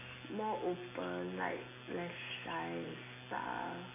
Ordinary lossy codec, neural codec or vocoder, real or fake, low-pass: none; none; real; 3.6 kHz